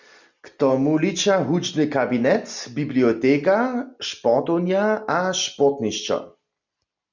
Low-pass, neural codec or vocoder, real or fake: 7.2 kHz; none; real